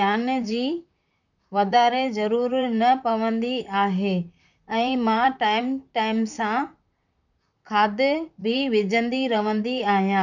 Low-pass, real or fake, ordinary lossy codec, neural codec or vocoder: 7.2 kHz; fake; none; vocoder, 44.1 kHz, 128 mel bands, Pupu-Vocoder